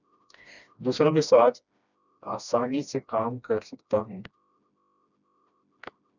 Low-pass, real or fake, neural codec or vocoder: 7.2 kHz; fake; codec, 16 kHz, 1 kbps, FreqCodec, smaller model